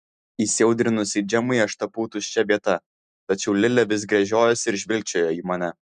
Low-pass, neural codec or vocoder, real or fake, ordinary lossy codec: 9.9 kHz; none; real; MP3, 96 kbps